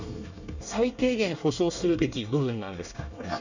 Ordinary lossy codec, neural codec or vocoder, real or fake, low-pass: none; codec, 24 kHz, 1 kbps, SNAC; fake; 7.2 kHz